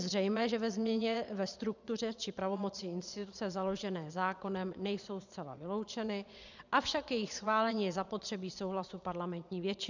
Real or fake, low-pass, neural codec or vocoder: fake; 7.2 kHz; vocoder, 22.05 kHz, 80 mel bands, Vocos